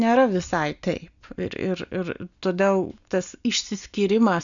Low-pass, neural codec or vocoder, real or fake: 7.2 kHz; none; real